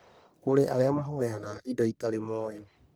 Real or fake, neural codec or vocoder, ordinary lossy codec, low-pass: fake; codec, 44.1 kHz, 1.7 kbps, Pupu-Codec; none; none